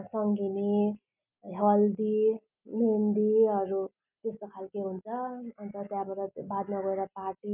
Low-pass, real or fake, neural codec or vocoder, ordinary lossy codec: 3.6 kHz; real; none; none